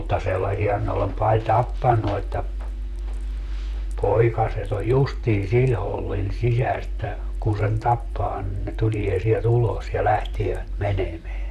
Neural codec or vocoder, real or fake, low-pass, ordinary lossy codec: vocoder, 44.1 kHz, 128 mel bands, Pupu-Vocoder; fake; 14.4 kHz; MP3, 96 kbps